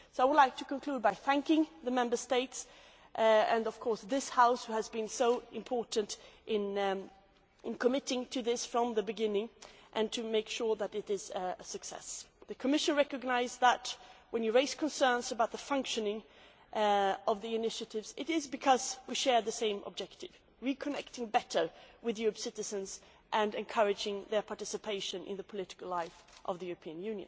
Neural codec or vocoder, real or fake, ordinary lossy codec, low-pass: none; real; none; none